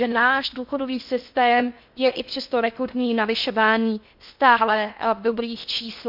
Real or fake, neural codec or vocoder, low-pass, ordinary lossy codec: fake; codec, 16 kHz in and 24 kHz out, 0.6 kbps, FocalCodec, streaming, 2048 codes; 5.4 kHz; MP3, 48 kbps